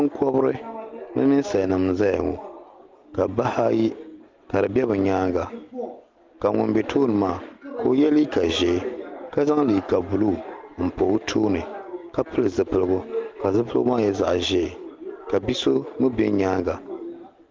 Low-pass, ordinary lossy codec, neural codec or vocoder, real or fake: 7.2 kHz; Opus, 32 kbps; none; real